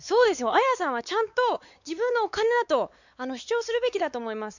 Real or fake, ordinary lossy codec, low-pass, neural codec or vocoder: fake; none; 7.2 kHz; codec, 16 kHz, 4 kbps, X-Codec, WavLM features, trained on Multilingual LibriSpeech